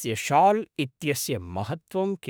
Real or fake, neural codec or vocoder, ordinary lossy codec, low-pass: fake; autoencoder, 48 kHz, 32 numbers a frame, DAC-VAE, trained on Japanese speech; none; none